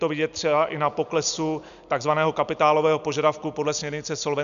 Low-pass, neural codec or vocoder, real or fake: 7.2 kHz; none; real